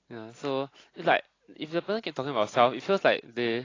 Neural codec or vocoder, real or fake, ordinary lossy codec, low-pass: none; real; AAC, 32 kbps; 7.2 kHz